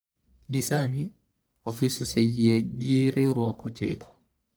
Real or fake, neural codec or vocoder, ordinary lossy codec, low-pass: fake; codec, 44.1 kHz, 1.7 kbps, Pupu-Codec; none; none